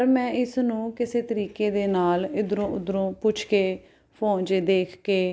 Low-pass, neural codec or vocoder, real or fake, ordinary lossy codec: none; none; real; none